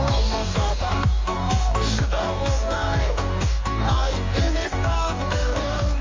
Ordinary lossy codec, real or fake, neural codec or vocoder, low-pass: MP3, 64 kbps; fake; codec, 44.1 kHz, 2.6 kbps, DAC; 7.2 kHz